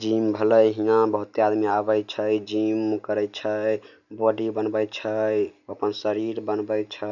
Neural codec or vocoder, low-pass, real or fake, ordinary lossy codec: none; 7.2 kHz; real; none